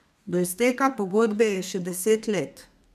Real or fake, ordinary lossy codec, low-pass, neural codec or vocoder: fake; none; 14.4 kHz; codec, 32 kHz, 1.9 kbps, SNAC